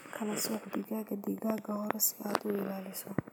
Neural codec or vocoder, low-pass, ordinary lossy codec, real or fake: none; none; none; real